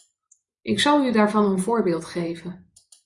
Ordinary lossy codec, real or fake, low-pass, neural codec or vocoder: Opus, 64 kbps; fake; 10.8 kHz; vocoder, 24 kHz, 100 mel bands, Vocos